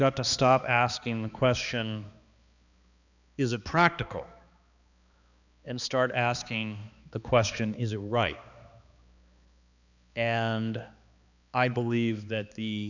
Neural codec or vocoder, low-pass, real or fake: codec, 16 kHz, 2 kbps, X-Codec, HuBERT features, trained on balanced general audio; 7.2 kHz; fake